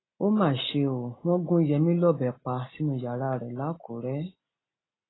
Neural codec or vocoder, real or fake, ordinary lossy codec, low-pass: none; real; AAC, 16 kbps; 7.2 kHz